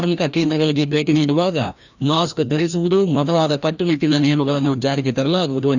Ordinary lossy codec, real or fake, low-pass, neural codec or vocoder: none; fake; 7.2 kHz; codec, 16 kHz, 1 kbps, FreqCodec, larger model